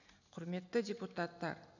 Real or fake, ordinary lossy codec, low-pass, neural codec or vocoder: real; none; 7.2 kHz; none